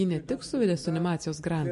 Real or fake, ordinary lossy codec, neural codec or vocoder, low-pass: real; MP3, 48 kbps; none; 14.4 kHz